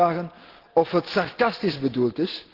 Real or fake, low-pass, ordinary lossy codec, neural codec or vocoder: real; 5.4 kHz; Opus, 16 kbps; none